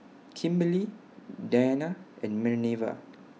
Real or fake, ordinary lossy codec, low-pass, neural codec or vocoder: real; none; none; none